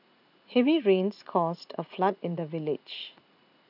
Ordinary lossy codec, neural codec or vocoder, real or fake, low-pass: none; vocoder, 44.1 kHz, 80 mel bands, Vocos; fake; 5.4 kHz